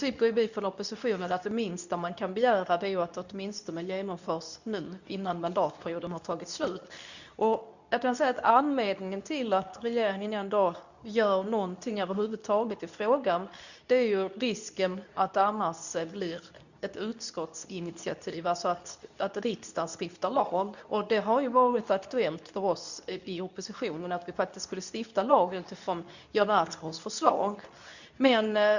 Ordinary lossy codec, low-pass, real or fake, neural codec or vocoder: none; 7.2 kHz; fake; codec, 24 kHz, 0.9 kbps, WavTokenizer, medium speech release version 2